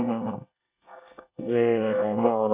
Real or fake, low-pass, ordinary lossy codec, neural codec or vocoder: fake; 3.6 kHz; Opus, 64 kbps; codec, 24 kHz, 1 kbps, SNAC